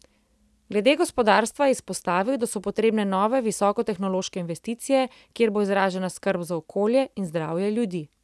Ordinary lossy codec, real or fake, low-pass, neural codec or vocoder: none; real; none; none